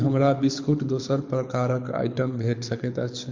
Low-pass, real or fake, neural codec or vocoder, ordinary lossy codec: 7.2 kHz; fake; codec, 24 kHz, 6 kbps, HILCodec; MP3, 48 kbps